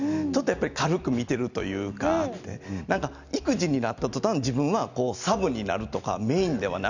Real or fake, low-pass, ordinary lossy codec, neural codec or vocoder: real; 7.2 kHz; none; none